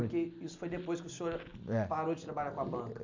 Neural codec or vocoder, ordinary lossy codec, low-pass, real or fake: none; MP3, 64 kbps; 7.2 kHz; real